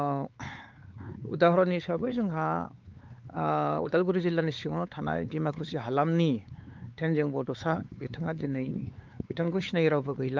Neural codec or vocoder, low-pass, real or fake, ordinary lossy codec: codec, 16 kHz, 4 kbps, X-Codec, WavLM features, trained on Multilingual LibriSpeech; 7.2 kHz; fake; Opus, 24 kbps